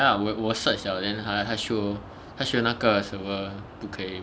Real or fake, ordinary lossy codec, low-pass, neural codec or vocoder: real; none; none; none